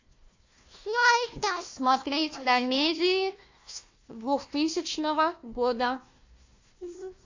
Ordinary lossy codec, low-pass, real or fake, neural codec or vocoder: AAC, 48 kbps; 7.2 kHz; fake; codec, 16 kHz, 1 kbps, FunCodec, trained on Chinese and English, 50 frames a second